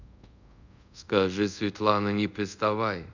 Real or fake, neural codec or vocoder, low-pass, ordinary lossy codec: fake; codec, 24 kHz, 0.5 kbps, DualCodec; 7.2 kHz; none